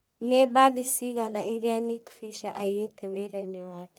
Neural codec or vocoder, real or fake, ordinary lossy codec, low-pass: codec, 44.1 kHz, 1.7 kbps, Pupu-Codec; fake; none; none